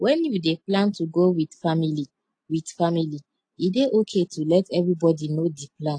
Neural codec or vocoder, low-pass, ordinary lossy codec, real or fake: none; 9.9 kHz; AAC, 48 kbps; real